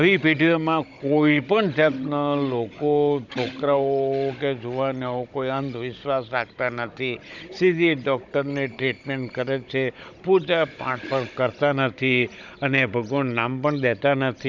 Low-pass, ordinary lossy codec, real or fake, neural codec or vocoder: 7.2 kHz; none; fake; codec, 16 kHz, 16 kbps, FunCodec, trained on Chinese and English, 50 frames a second